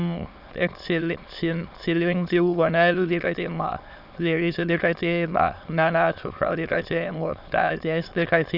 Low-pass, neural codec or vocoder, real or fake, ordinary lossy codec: 5.4 kHz; autoencoder, 22.05 kHz, a latent of 192 numbers a frame, VITS, trained on many speakers; fake; AAC, 48 kbps